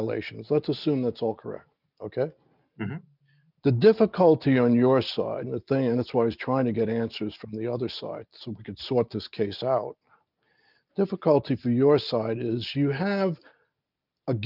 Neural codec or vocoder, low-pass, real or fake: none; 5.4 kHz; real